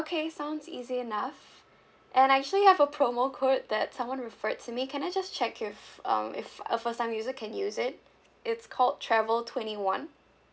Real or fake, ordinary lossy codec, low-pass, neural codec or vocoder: real; none; none; none